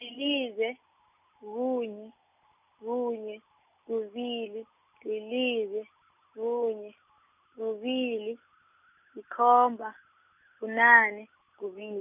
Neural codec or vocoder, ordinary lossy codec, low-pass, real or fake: none; none; 3.6 kHz; real